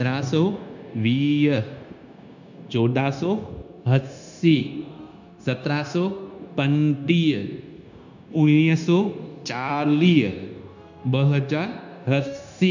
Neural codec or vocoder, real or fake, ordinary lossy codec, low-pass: codec, 16 kHz, 0.9 kbps, LongCat-Audio-Codec; fake; none; 7.2 kHz